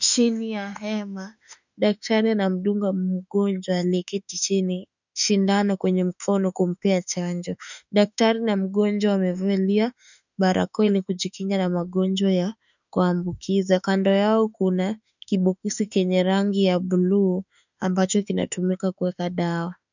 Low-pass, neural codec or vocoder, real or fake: 7.2 kHz; autoencoder, 48 kHz, 32 numbers a frame, DAC-VAE, trained on Japanese speech; fake